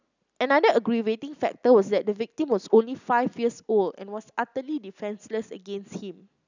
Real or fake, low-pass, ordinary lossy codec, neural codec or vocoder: real; 7.2 kHz; none; none